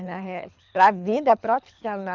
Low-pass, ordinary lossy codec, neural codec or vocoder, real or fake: 7.2 kHz; none; codec, 24 kHz, 3 kbps, HILCodec; fake